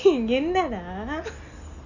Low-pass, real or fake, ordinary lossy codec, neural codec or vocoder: 7.2 kHz; real; none; none